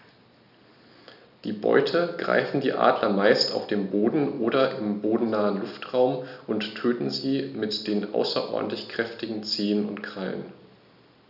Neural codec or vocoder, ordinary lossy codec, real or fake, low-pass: none; none; real; 5.4 kHz